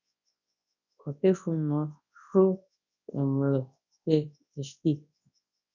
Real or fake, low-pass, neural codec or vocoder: fake; 7.2 kHz; codec, 24 kHz, 0.9 kbps, WavTokenizer, large speech release